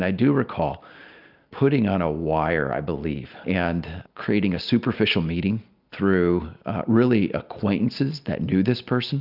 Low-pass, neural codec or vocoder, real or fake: 5.4 kHz; none; real